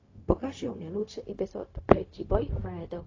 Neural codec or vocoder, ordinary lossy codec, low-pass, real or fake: codec, 16 kHz, 0.4 kbps, LongCat-Audio-Codec; AAC, 32 kbps; 7.2 kHz; fake